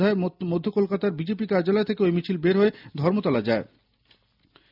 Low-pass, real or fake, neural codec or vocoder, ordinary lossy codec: 5.4 kHz; real; none; none